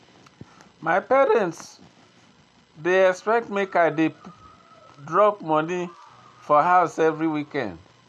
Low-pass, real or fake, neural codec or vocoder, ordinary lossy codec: none; real; none; none